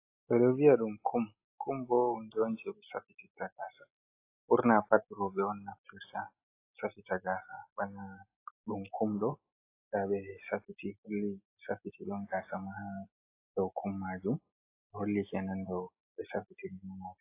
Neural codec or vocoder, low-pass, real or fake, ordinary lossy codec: none; 3.6 kHz; real; AAC, 24 kbps